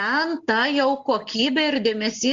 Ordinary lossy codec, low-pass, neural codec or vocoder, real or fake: Opus, 32 kbps; 7.2 kHz; none; real